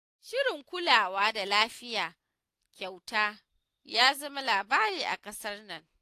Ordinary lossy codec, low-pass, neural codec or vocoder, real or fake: AAC, 64 kbps; 14.4 kHz; none; real